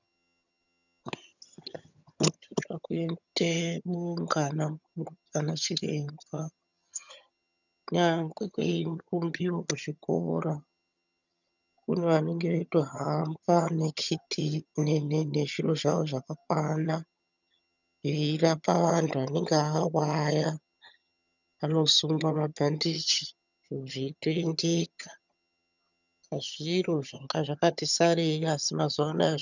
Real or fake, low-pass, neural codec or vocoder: fake; 7.2 kHz; vocoder, 22.05 kHz, 80 mel bands, HiFi-GAN